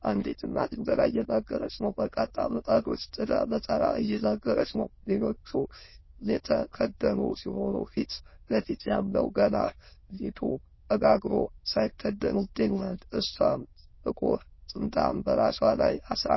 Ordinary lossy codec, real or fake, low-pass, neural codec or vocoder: MP3, 24 kbps; fake; 7.2 kHz; autoencoder, 22.05 kHz, a latent of 192 numbers a frame, VITS, trained on many speakers